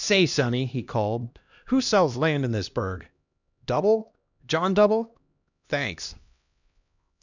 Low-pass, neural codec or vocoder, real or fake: 7.2 kHz; codec, 16 kHz, 1 kbps, X-Codec, HuBERT features, trained on LibriSpeech; fake